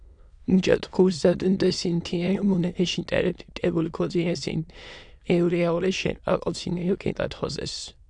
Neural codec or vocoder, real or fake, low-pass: autoencoder, 22.05 kHz, a latent of 192 numbers a frame, VITS, trained on many speakers; fake; 9.9 kHz